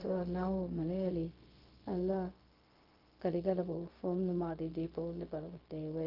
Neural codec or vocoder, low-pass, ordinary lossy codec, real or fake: codec, 16 kHz, 0.4 kbps, LongCat-Audio-Codec; 5.4 kHz; Opus, 32 kbps; fake